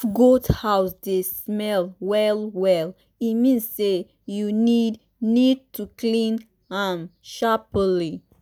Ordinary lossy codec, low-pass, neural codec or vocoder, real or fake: none; none; none; real